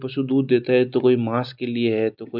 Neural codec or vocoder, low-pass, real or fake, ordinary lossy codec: none; 5.4 kHz; real; none